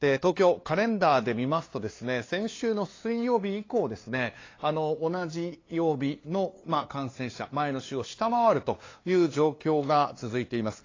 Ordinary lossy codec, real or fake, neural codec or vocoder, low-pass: AAC, 32 kbps; fake; codec, 16 kHz, 2 kbps, FunCodec, trained on LibriTTS, 25 frames a second; 7.2 kHz